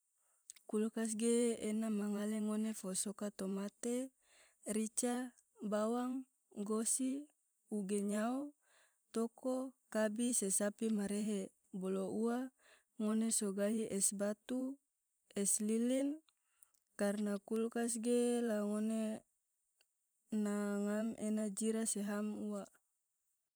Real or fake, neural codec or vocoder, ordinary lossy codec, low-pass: fake; vocoder, 44.1 kHz, 128 mel bands every 512 samples, BigVGAN v2; none; none